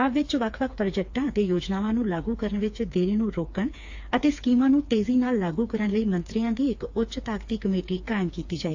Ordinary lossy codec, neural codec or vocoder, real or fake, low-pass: AAC, 48 kbps; codec, 16 kHz, 4 kbps, FreqCodec, smaller model; fake; 7.2 kHz